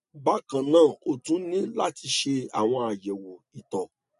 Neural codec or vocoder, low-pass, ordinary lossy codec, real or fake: none; 14.4 kHz; MP3, 48 kbps; real